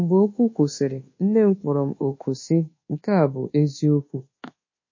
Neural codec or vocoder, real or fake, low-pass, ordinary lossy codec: codec, 24 kHz, 1.2 kbps, DualCodec; fake; 7.2 kHz; MP3, 32 kbps